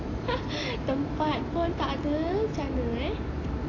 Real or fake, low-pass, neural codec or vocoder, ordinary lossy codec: real; 7.2 kHz; none; AAC, 32 kbps